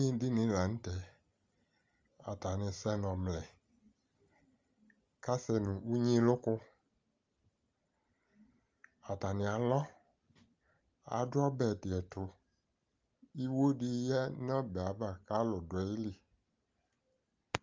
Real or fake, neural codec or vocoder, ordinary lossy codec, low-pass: real; none; Opus, 32 kbps; 7.2 kHz